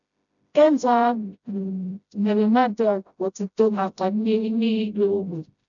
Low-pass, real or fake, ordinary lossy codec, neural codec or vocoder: 7.2 kHz; fake; none; codec, 16 kHz, 0.5 kbps, FreqCodec, smaller model